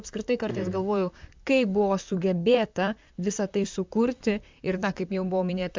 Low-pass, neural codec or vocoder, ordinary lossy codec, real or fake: 7.2 kHz; vocoder, 44.1 kHz, 128 mel bands, Pupu-Vocoder; MP3, 64 kbps; fake